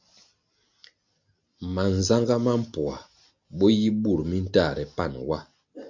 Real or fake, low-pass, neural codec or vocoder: real; 7.2 kHz; none